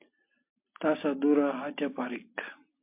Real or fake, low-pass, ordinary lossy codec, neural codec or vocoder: real; 3.6 kHz; MP3, 32 kbps; none